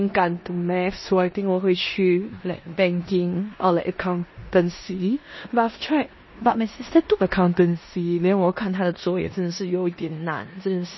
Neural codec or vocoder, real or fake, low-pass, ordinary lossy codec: codec, 16 kHz in and 24 kHz out, 0.9 kbps, LongCat-Audio-Codec, four codebook decoder; fake; 7.2 kHz; MP3, 24 kbps